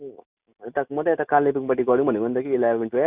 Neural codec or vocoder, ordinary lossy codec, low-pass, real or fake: none; Opus, 64 kbps; 3.6 kHz; real